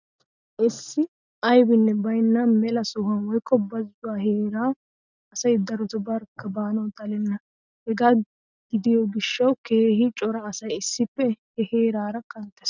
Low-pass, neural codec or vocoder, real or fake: 7.2 kHz; none; real